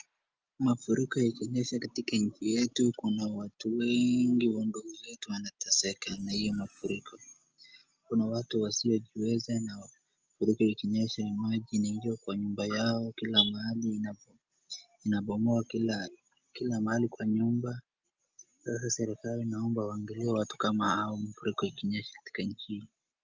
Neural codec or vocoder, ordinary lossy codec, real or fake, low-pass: none; Opus, 24 kbps; real; 7.2 kHz